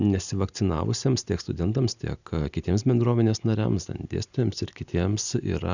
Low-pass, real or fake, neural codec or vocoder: 7.2 kHz; real; none